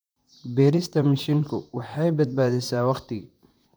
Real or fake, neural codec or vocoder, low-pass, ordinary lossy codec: fake; vocoder, 44.1 kHz, 128 mel bands, Pupu-Vocoder; none; none